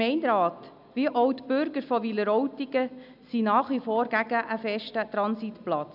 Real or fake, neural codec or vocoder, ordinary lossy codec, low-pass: real; none; none; 5.4 kHz